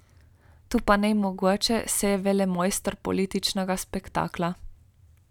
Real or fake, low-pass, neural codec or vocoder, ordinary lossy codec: real; 19.8 kHz; none; none